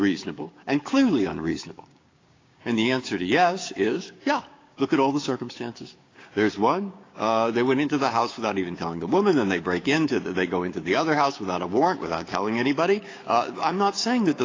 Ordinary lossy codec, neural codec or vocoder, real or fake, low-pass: AAC, 32 kbps; codec, 16 kHz, 6 kbps, DAC; fake; 7.2 kHz